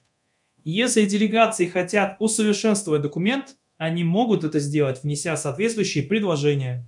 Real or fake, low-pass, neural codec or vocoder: fake; 10.8 kHz; codec, 24 kHz, 0.9 kbps, DualCodec